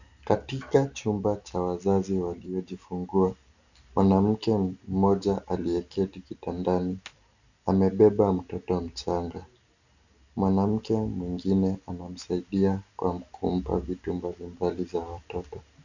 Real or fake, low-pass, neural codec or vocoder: real; 7.2 kHz; none